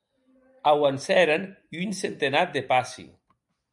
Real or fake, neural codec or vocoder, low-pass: real; none; 10.8 kHz